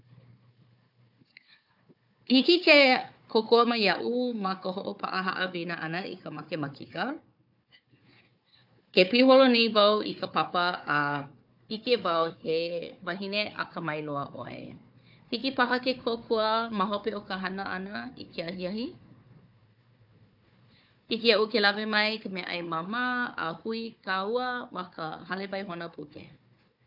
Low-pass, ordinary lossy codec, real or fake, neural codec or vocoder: 5.4 kHz; AAC, 48 kbps; fake; codec, 16 kHz, 4 kbps, FunCodec, trained on Chinese and English, 50 frames a second